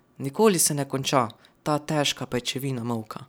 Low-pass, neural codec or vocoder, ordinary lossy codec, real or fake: none; none; none; real